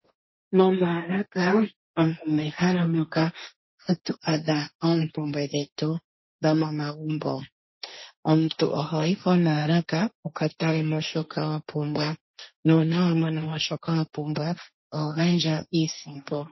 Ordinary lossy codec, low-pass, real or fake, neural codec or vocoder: MP3, 24 kbps; 7.2 kHz; fake; codec, 16 kHz, 1.1 kbps, Voila-Tokenizer